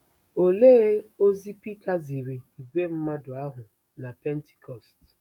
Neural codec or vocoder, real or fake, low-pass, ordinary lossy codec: codec, 44.1 kHz, 7.8 kbps, DAC; fake; 19.8 kHz; none